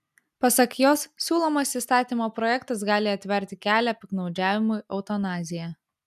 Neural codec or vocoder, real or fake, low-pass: none; real; 14.4 kHz